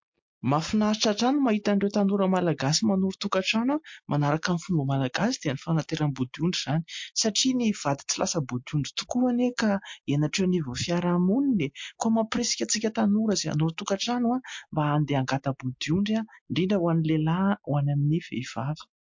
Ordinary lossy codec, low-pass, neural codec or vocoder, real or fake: MP3, 48 kbps; 7.2 kHz; none; real